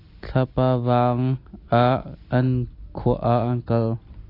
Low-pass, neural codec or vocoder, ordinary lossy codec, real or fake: 5.4 kHz; none; AAC, 32 kbps; real